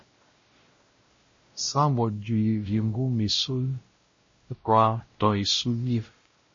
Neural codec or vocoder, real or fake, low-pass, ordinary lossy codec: codec, 16 kHz, 0.5 kbps, X-Codec, WavLM features, trained on Multilingual LibriSpeech; fake; 7.2 kHz; MP3, 32 kbps